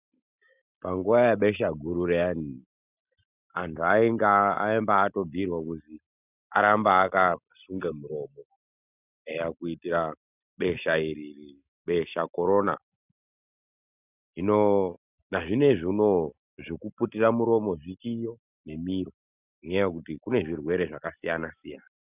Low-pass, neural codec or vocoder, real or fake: 3.6 kHz; none; real